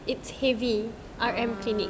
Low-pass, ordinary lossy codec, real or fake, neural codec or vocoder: none; none; real; none